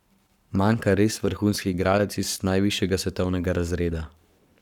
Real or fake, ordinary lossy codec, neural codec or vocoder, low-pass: fake; none; codec, 44.1 kHz, 7.8 kbps, Pupu-Codec; 19.8 kHz